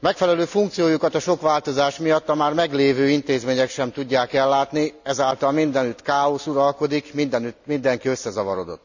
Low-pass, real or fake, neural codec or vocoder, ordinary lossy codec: 7.2 kHz; real; none; none